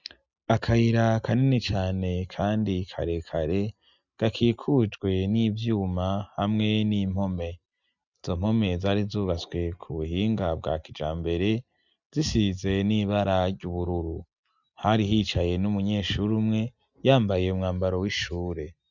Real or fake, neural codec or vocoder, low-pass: real; none; 7.2 kHz